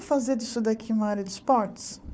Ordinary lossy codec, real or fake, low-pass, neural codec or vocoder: none; fake; none; codec, 16 kHz, 4 kbps, FunCodec, trained on Chinese and English, 50 frames a second